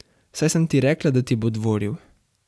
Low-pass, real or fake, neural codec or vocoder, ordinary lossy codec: none; real; none; none